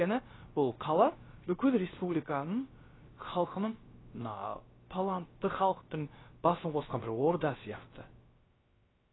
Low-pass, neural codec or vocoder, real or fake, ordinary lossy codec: 7.2 kHz; codec, 16 kHz, about 1 kbps, DyCAST, with the encoder's durations; fake; AAC, 16 kbps